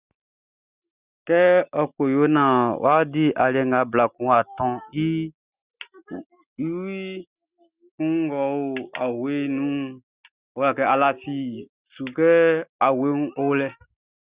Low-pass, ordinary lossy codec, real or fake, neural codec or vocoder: 3.6 kHz; Opus, 64 kbps; real; none